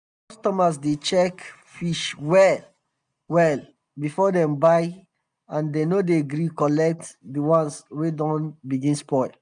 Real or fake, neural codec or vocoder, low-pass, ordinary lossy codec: real; none; 9.9 kHz; none